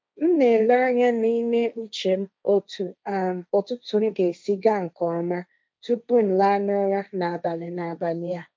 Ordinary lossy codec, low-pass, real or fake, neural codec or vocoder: none; none; fake; codec, 16 kHz, 1.1 kbps, Voila-Tokenizer